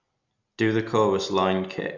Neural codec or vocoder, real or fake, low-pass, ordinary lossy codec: none; real; 7.2 kHz; none